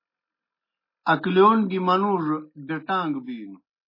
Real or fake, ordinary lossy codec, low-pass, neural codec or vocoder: real; MP3, 24 kbps; 5.4 kHz; none